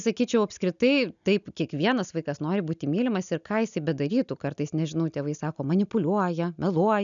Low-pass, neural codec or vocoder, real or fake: 7.2 kHz; none; real